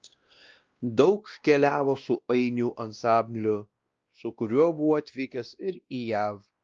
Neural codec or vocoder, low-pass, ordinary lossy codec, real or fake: codec, 16 kHz, 1 kbps, X-Codec, WavLM features, trained on Multilingual LibriSpeech; 7.2 kHz; Opus, 24 kbps; fake